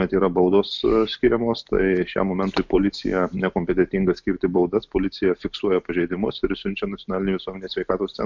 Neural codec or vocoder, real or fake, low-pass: none; real; 7.2 kHz